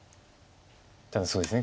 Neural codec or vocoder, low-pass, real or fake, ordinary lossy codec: none; none; real; none